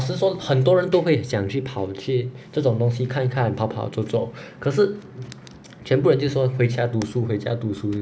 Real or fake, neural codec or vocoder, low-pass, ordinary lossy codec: real; none; none; none